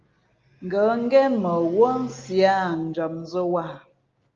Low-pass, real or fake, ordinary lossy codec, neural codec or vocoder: 7.2 kHz; real; Opus, 32 kbps; none